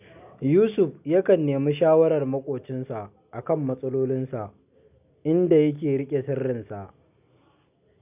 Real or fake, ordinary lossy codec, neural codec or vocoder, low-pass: real; none; none; 3.6 kHz